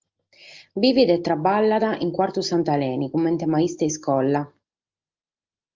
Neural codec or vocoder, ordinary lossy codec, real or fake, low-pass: none; Opus, 32 kbps; real; 7.2 kHz